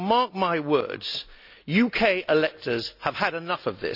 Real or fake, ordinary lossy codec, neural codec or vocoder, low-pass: real; none; none; 5.4 kHz